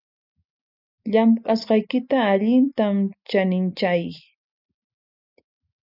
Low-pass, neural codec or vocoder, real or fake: 5.4 kHz; none; real